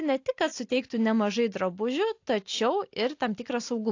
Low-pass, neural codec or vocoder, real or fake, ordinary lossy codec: 7.2 kHz; none; real; AAC, 48 kbps